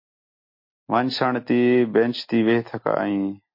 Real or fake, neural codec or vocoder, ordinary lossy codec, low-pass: real; none; MP3, 32 kbps; 5.4 kHz